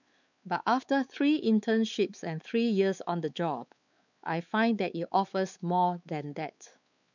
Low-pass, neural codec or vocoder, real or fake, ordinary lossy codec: 7.2 kHz; codec, 16 kHz, 4 kbps, X-Codec, WavLM features, trained on Multilingual LibriSpeech; fake; none